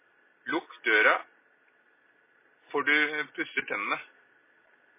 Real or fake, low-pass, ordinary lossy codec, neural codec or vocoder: real; 3.6 kHz; MP3, 16 kbps; none